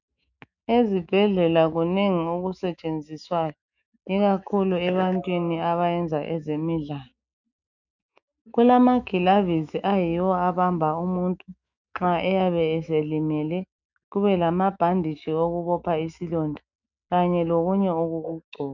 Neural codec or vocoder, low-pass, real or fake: autoencoder, 48 kHz, 128 numbers a frame, DAC-VAE, trained on Japanese speech; 7.2 kHz; fake